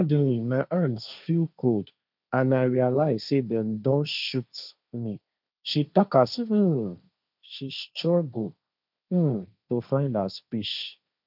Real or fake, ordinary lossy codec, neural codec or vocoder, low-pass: fake; none; codec, 16 kHz, 1.1 kbps, Voila-Tokenizer; 5.4 kHz